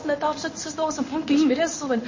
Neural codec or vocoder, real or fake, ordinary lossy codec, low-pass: codec, 16 kHz in and 24 kHz out, 1 kbps, XY-Tokenizer; fake; MP3, 32 kbps; 7.2 kHz